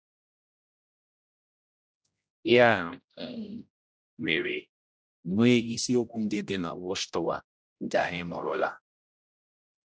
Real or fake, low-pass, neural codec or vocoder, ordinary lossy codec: fake; none; codec, 16 kHz, 0.5 kbps, X-Codec, HuBERT features, trained on general audio; none